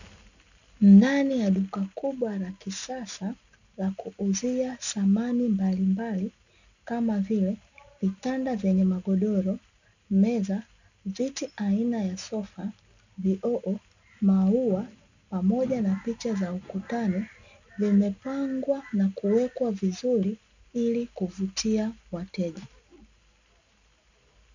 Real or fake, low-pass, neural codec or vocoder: real; 7.2 kHz; none